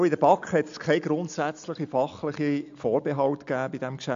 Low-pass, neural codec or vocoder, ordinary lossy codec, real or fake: 7.2 kHz; none; AAC, 64 kbps; real